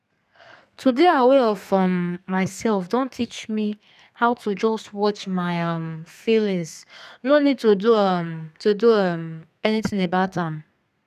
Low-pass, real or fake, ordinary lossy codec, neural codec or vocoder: 14.4 kHz; fake; none; codec, 32 kHz, 1.9 kbps, SNAC